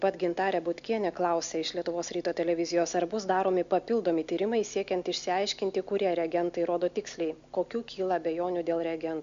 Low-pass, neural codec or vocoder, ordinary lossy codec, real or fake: 7.2 kHz; none; MP3, 64 kbps; real